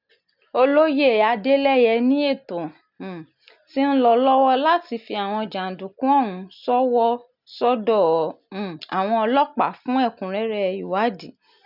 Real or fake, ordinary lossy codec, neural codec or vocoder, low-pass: real; none; none; 5.4 kHz